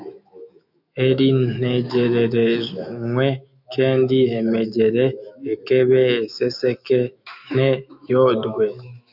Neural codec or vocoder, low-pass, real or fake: autoencoder, 48 kHz, 128 numbers a frame, DAC-VAE, trained on Japanese speech; 5.4 kHz; fake